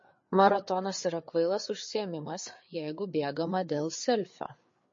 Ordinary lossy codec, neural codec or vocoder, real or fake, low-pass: MP3, 32 kbps; codec, 16 kHz, 4 kbps, FreqCodec, larger model; fake; 7.2 kHz